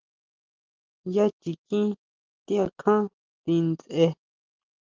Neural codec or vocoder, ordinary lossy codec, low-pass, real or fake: none; Opus, 24 kbps; 7.2 kHz; real